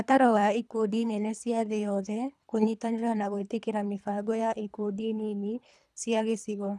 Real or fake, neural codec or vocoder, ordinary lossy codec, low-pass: fake; codec, 24 kHz, 3 kbps, HILCodec; none; none